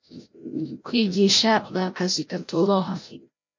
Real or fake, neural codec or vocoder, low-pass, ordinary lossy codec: fake; codec, 16 kHz, 0.5 kbps, FreqCodec, larger model; 7.2 kHz; MP3, 48 kbps